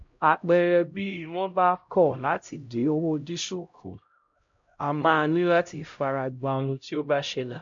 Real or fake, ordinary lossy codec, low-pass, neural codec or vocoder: fake; MP3, 48 kbps; 7.2 kHz; codec, 16 kHz, 0.5 kbps, X-Codec, HuBERT features, trained on LibriSpeech